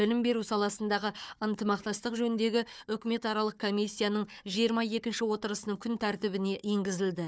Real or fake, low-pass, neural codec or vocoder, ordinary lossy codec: fake; none; codec, 16 kHz, 4 kbps, FunCodec, trained on Chinese and English, 50 frames a second; none